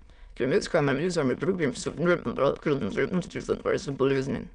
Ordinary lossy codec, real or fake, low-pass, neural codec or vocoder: none; fake; 9.9 kHz; autoencoder, 22.05 kHz, a latent of 192 numbers a frame, VITS, trained on many speakers